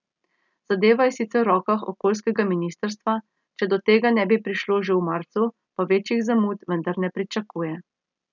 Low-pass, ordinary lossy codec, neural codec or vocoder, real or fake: 7.2 kHz; none; none; real